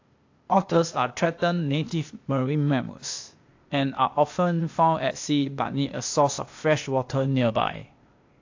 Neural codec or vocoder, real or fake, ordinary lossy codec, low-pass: codec, 16 kHz, 0.8 kbps, ZipCodec; fake; AAC, 48 kbps; 7.2 kHz